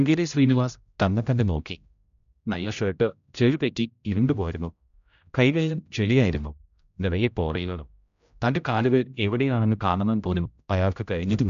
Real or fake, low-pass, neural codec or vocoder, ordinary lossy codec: fake; 7.2 kHz; codec, 16 kHz, 0.5 kbps, X-Codec, HuBERT features, trained on general audio; none